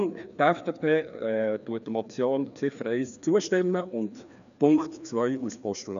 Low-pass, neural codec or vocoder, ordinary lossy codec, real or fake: 7.2 kHz; codec, 16 kHz, 2 kbps, FreqCodec, larger model; none; fake